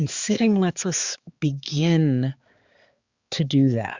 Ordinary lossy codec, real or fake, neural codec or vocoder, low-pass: Opus, 64 kbps; fake; codec, 16 kHz, 4 kbps, X-Codec, HuBERT features, trained on balanced general audio; 7.2 kHz